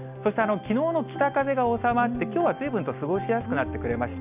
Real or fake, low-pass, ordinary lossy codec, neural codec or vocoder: real; 3.6 kHz; none; none